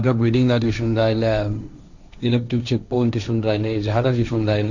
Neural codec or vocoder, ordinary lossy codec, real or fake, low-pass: codec, 16 kHz, 1.1 kbps, Voila-Tokenizer; none; fake; 7.2 kHz